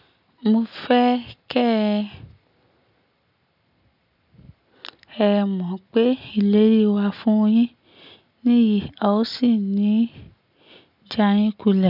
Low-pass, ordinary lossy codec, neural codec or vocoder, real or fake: 5.4 kHz; none; none; real